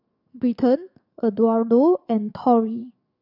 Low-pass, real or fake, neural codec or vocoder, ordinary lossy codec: 5.4 kHz; fake; codec, 44.1 kHz, 7.8 kbps, DAC; none